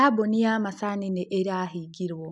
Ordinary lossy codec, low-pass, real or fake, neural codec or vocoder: none; 10.8 kHz; real; none